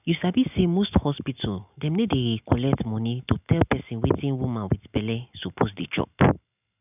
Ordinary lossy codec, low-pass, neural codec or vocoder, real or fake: none; 3.6 kHz; none; real